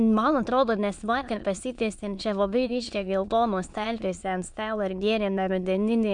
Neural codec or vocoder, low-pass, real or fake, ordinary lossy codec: autoencoder, 22.05 kHz, a latent of 192 numbers a frame, VITS, trained on many speakers; 9.9 kHz; fake; MP3, 64 kbps